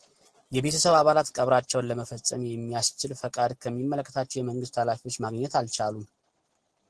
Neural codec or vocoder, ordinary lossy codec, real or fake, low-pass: none; Opus, 16 kbps; real; 10.8 kHz